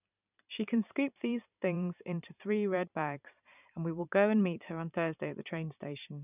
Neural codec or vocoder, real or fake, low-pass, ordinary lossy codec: vocoder, 44.1 kHz, 128 mel bands every 512 samples, BigVGAN v2; fake; 3.6 kHz; none